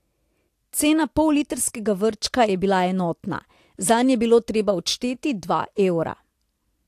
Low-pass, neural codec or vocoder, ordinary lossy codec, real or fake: 14.4 kHz; none; AAC, 64 kbps; real